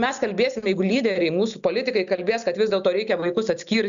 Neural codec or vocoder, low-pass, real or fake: none; 7.2 kHz; real